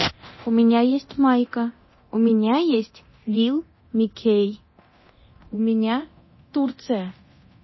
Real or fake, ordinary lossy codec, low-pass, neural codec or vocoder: fake; MP3, 24 kbps; 7.2 kHz; codec, 24 kHz, 0.9 kbps, DualCodec